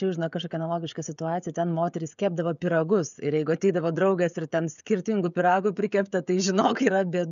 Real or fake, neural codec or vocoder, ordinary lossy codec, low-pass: fake; codec, 16 kHz, 16 kbps, FreqCodec, smaller model; MP3, 96 kbps; 7.2 kHz